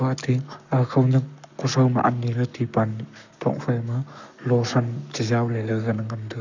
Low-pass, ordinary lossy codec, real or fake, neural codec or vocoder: 7.2 kHz; none; fake; codec, 44.1 kHz, 7.8 kbps, Pupu-Codec